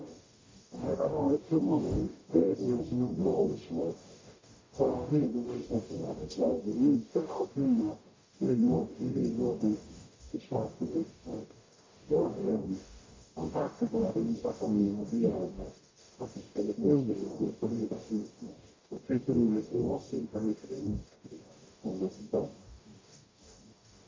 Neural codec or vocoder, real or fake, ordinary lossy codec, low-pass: codec, 44.1 kHz, 0.9 kbps, DAC; fake; MP3, 32 kbps; 7.2 kHz